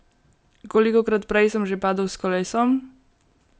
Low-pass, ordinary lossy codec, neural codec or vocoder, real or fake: none; none; none; real